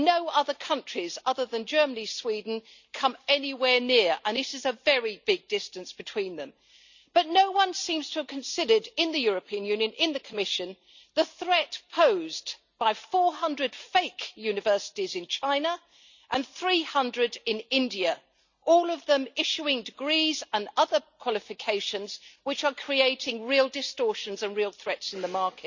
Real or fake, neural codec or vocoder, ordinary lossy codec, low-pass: real; none; none; 7.2 kHz